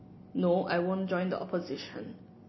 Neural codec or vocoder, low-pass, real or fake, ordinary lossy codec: none; 7.2 kHz; real; MP3, 24 kbps